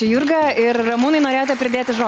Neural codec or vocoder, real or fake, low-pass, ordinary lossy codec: none; real; 7.2 kHz; Opus, 24 kbps